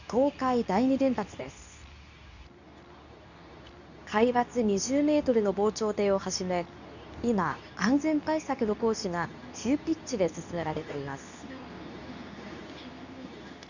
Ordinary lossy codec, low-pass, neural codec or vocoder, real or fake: none; 7.2 kHz; codec, 24 kHz, 0.9 kbps, WavTokenizer, medium speech release version 2; fake